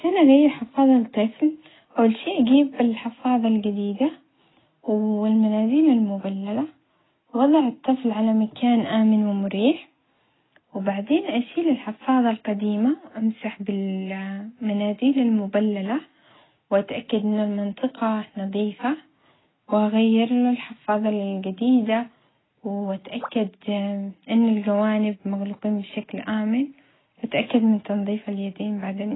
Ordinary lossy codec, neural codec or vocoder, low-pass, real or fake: AAC, 16 kbps; none; 7.2 kHz; real